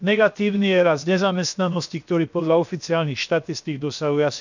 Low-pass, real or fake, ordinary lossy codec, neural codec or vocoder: 7.2 kHz; fake; none; codec, 16 kHz, 0.7 kbps, FocalCodec